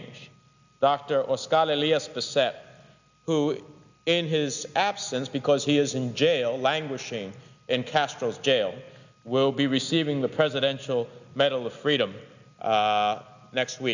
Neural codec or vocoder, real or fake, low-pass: none; real; 7.2 kHz